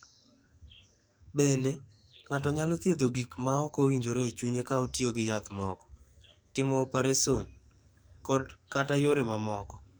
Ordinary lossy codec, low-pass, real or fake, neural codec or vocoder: none; none; fake; codec, 44.1 kHz, 2.6 kbps, SNAC